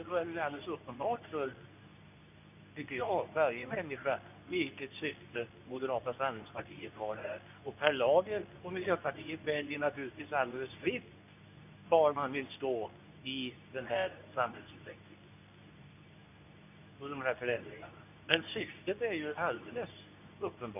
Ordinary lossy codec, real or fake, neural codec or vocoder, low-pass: none; fake; codec, 24 kHz, 0.9 kbps, WavTokenizer, medium speech release version 2; 3.6 kHz